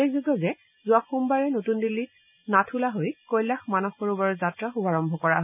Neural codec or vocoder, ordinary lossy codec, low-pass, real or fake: none; none; 3.6 kHz; real